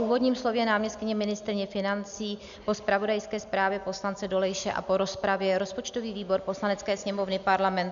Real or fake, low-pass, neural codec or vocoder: real; 7.2 kHz; none